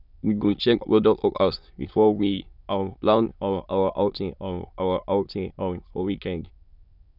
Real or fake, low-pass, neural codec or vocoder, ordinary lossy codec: fake; 5.4 kHz; autoencoder, 22.05 kHz, a latent of 192 numbers a frame, VITS, trained on many speakers; none